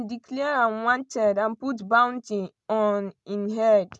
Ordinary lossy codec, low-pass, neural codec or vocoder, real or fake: none; none; none; real